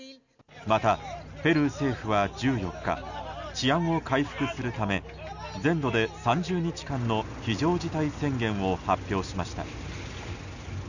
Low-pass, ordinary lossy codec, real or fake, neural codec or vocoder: 7.2 kHz; none; real; none